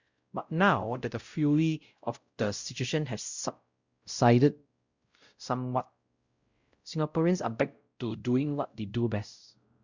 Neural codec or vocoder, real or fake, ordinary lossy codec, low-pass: codec, 16 kHz, 0.5 kbps, X-Codec, WavLM features, trained on Multilingual LibriSpeech; fake; Opus, 64 kbps; 7.2 kHz